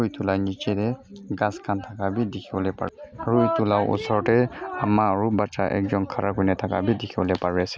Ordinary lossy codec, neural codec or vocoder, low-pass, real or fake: none; none; none; real